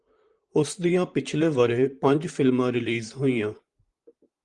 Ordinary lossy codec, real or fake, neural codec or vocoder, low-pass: Opus, 24 kbps; fake; vocoder, 44.1 kHz, 128 mel bands, Pupu-Vocoder; 10.8 kHz